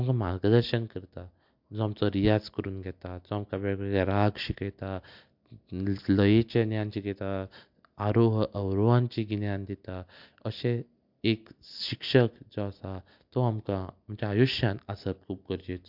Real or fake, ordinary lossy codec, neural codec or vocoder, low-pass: real; MP3, 48 kbps; none; 5.4 kHz